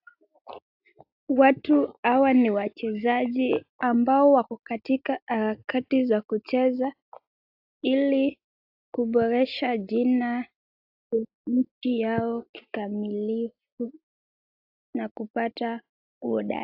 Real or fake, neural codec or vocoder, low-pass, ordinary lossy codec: real; none; 5.4 kHz; AAC, 48 kbps